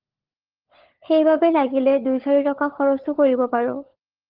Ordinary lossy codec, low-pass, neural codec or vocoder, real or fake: Opus, 16 kbps; 5.4 kHz; codec, 16 kHz, 16 kbps, FunCodec, trained on LibriTTS, 50 frames a second; fake